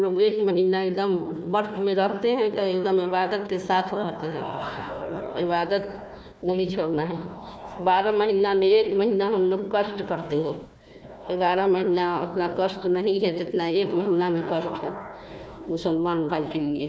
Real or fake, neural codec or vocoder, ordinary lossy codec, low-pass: fake; codec, 16 kHz, 1 kbps, FunCodec, trained on Chinese and English, 50 frames a second; none; none